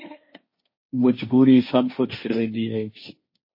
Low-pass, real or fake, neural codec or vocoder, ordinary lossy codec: 5.4 kHz; fake; codec, 16 kHz, 1.1 kbps, Voila-Tokenizer; MP3, 24 kbps